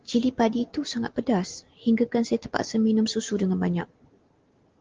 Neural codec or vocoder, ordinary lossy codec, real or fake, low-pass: none; Opus, 16 kbps; real; 7.2 kHz